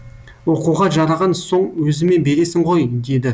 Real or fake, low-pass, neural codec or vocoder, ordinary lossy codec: real; none; none; none